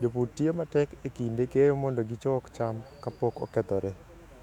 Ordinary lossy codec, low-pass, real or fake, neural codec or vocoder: none; 19.8 kHz; fake; autoencoder, 48 kHz, 128 numbers a frame, DAC-VAE, trained on Japanese speech